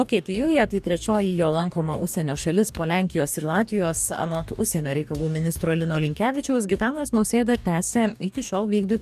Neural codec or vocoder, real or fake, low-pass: codec, 44.1 kHz, 2.6 kbps, DAC; fake; 14.4 kHz